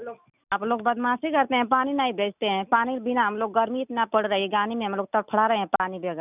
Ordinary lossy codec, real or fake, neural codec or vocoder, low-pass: none; real; none; 3.6 kHz